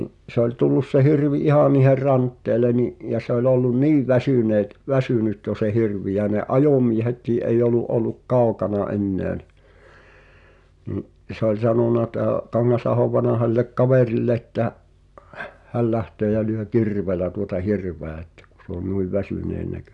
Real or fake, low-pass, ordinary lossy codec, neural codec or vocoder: real; 10.8 kHz; none; none